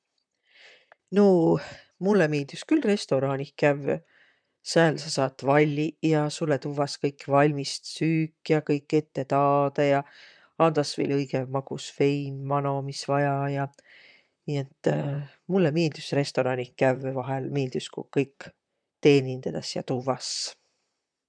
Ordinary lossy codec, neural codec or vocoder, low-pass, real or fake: MP3, 96 kbps; vocoder, 44.1 kHz, 128 mel bands, Pupu-Vocoder; 9.9 kHz; fake